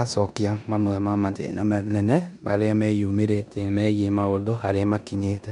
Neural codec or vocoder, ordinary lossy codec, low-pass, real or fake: codec, 16 kHz in and 24 kHz out, 0.9 kbps, LongCat-Audio-Codec, four codebook decoder; none; 10.8 kHz; fake